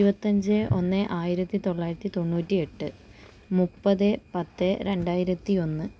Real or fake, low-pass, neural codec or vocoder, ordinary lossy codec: real; none; none; none